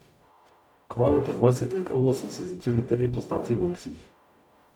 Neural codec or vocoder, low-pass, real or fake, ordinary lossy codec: codec, 44.1 kHz, 0.9 kbps, DAC; 19.8 kHz; fake; none